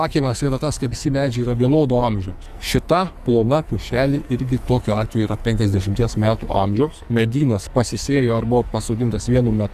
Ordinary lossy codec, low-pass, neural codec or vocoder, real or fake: Opus, 64 kbps; 14.4 kHz; codec, 44.1 kHz, 2.6 kbps, SNAC; fake